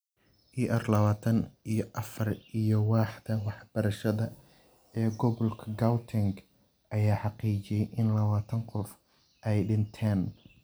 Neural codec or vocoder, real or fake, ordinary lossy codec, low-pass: none; real; none; none